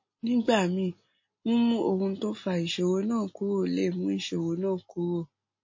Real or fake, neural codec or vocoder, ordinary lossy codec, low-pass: real; none; MP3, 32 kbps; 7.2 kHz